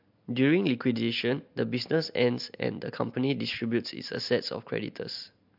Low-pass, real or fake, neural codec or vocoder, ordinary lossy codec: 5.4 kHz; real; none; MP3, 48 kbps